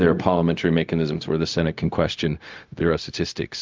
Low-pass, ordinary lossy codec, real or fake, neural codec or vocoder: 7.2 kHz; Opus, 24 kbps; fake; codec, 16 kHz, 0.4 kbps, LongCat-Audio-Codec